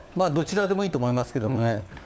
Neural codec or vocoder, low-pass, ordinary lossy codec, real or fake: codec, 16 kHz, 4 kbps, FunCodec, trained on LibriTTS, 50 frames a second; none; none; fake